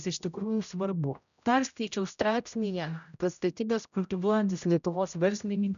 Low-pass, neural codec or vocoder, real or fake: 7.2 kHz; codec, 16 kHz, 0.5 kbps, X-Codec, HuBERT features, trained on general audio; fake